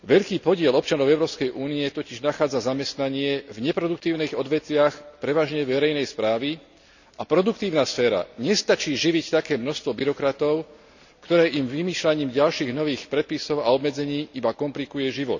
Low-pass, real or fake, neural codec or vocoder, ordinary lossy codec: 7.2 kHz; real; none; none